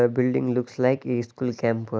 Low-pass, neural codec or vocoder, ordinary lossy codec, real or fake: none; none; none; real